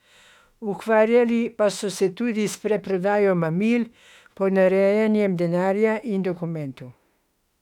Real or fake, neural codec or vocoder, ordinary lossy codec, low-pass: fake; autoencoder, 48 kHz, 32 numbers a frame, DAC-VAE, trained on Japanese speech; none; 19.8 kHz